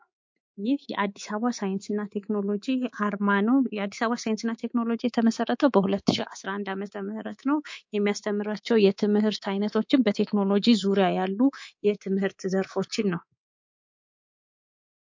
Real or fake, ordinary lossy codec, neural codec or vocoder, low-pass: fake; MP3, 48 kbps; codec, 24 kHz, 3.1 kbps, DualCodec; 7.2 kHz